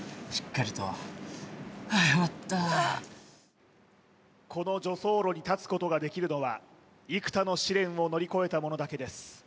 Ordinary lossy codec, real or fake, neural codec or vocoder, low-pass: none; real; none; none